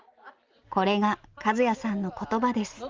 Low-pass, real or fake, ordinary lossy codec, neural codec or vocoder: 7.2 kHz; real; Opus, 32 kbps; none